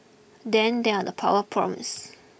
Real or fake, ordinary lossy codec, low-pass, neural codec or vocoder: real; none; none; none